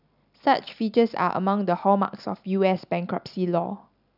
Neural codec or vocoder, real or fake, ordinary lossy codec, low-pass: none; real; none; 5.4 kHz